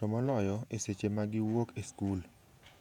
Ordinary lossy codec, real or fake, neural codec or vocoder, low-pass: none; fake; vocoder, 48 kHz, 128 mel bands, Vocos; 19.8 kHz